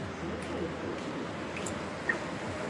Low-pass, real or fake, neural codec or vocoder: 10.8 kHz; real; none